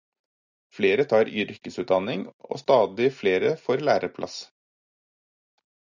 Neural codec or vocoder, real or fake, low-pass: none; real; 7.2 kHz